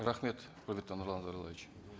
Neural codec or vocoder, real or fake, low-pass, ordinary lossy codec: none; real; none; none